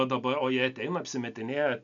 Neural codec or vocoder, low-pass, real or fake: none; 7.2 kHz; real